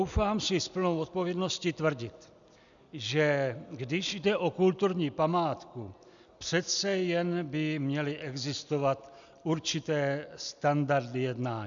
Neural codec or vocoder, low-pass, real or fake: none; 7.2 kHz; real